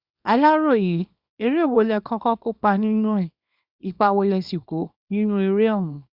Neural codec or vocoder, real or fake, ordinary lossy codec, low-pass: codec, 24 kHz, 0.9 kbps, WavTokenizer, small release; fake; Opus, 64 kbps; 5.4 kHz